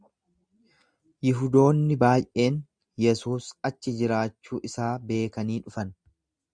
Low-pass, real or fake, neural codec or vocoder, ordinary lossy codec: 9.9 kHz; real; none; Opus, 64 kbps